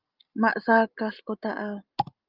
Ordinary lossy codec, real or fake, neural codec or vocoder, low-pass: Opus, 24 kbps; real; none; 5.4 kHz